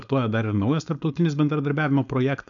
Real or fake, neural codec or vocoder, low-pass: fake; codec, 16 kHz, 4.8 kbps, FACodec; 7.2 kHz